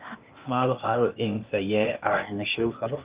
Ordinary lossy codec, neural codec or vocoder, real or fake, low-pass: Opus, 32 kbps; codec, 16 kHz, 0.8 kbps, ZipCodec; fake; 3.6 kHz